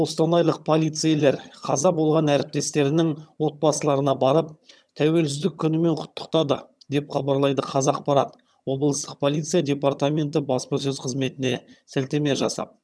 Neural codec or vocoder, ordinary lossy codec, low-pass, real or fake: vocoder, 22.05 kHz, 80 mel bands, HiFi-GAN; none; none; fake